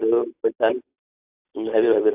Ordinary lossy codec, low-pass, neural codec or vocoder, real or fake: AAC, 32 kbps; 3.6 kHz; none; real